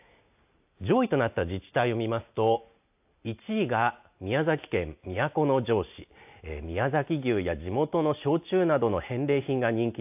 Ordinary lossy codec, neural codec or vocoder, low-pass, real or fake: AAC, 32 kbps; none; 3.6 kHz; real